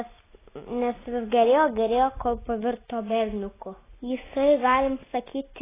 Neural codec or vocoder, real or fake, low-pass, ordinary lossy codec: none; real; 3.6 kHz; AAC, 16 kbps